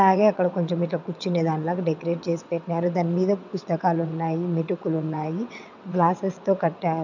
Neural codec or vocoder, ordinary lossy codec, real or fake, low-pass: vocoder, 22.05 kHz, 80 mel bands, WaveNeXt; none; fake; 7.2 kHz